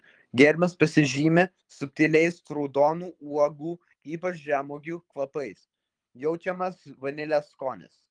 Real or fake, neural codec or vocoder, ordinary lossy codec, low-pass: fake; codec, 24 kHz, 6 kbps, HILCodec; Opus, 32 kbps; 9.9 kHz